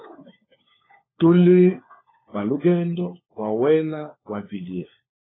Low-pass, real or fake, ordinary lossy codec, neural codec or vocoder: 7.2 kHz; fake; AAC, 16 kbps; codec, 16 kHz, 4 kbps, FunCodec, trained on LibriTTS, 50 frames a second